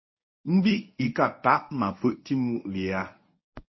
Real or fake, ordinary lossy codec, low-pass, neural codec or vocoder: fake; MP3, 24 kbps; 7.2 kHz; codec, 24 kHz, 0.9 kbps, WavTokenizer, medium speech release version 1